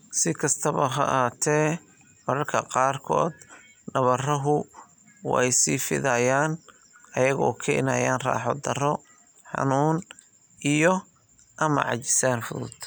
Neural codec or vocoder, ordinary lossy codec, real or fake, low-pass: none; none; real; none